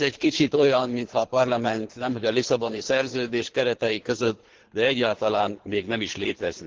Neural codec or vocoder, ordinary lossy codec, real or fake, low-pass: codec, 24 kHz, 3 kbps, HILCodec; Opus, 16 kbps; fake; 7.2 kHz